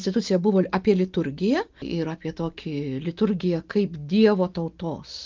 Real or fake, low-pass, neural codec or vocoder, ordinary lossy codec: real; 7.2 kHz; none; Opus, 24 kbps